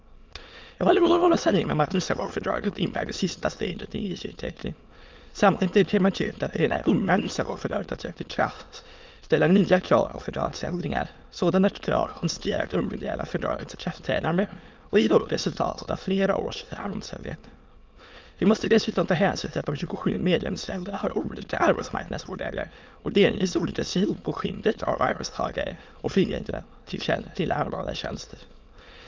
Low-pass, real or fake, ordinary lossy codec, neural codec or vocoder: 7.2 kHz; fake; Opus, 24 kbps; autoencoder, 22.05 kHz, a latent of 192 numbers a frame, VITS, trained on many speakers